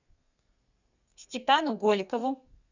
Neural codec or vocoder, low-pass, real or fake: codec, 32 kHz, 1.9 kbps, SNAC; 7.2 kHz; fake